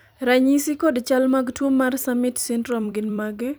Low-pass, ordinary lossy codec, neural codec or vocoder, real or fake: none; none; vocoder, 44.1 kHz, 128 mel bands every 256 samples, BigVGAN v2; fake